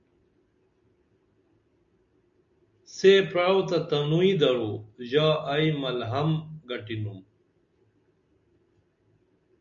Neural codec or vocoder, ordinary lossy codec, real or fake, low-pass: none; MP3, 64 kbps; real; 7.2 kHz